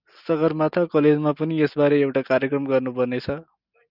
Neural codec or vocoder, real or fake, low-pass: none; real; 5.4 kHz